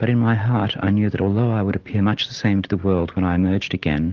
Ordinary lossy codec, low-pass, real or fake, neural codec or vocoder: Opus, 16 kbps; 7.2 kHz; real; none